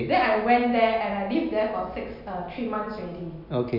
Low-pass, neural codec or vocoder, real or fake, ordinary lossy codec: 5.4 kHz; none; real; none